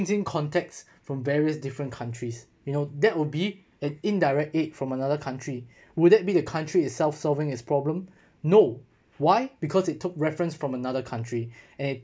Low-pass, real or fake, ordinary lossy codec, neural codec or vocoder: none; real; none; none